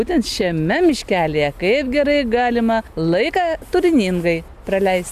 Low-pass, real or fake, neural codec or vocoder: 14.4 kHz; real; none